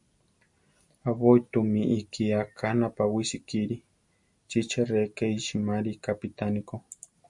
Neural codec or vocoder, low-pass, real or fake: none; 10.8 kHz; real